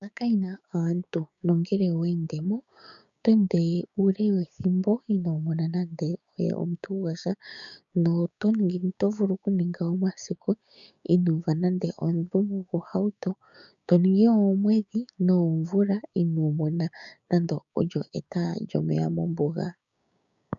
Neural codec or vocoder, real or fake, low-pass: codec, 16 kHz, 6 kbps, DAC; fake; 7.2 kHz